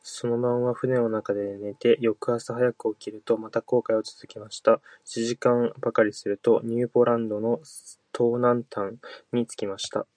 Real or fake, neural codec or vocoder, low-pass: real; none; 9.9 kHz